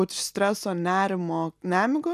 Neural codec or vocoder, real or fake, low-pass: none; real; 14.4 kHz